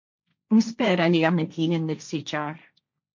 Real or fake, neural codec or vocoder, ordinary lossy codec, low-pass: fake; codec, 16 kHz, 1.1 kbps, Voila-Tokenizer; MP3, 48 kbps; 7.2 kHz